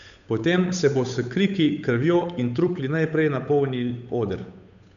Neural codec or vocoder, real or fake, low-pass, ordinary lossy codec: codec, 16 kHz, 8 kbps, FunCodec, trained on Chinese and English, 25 frames a second; fake; 7.2 kHz; none